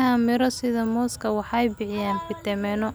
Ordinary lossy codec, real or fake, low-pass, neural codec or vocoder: none; real; none; none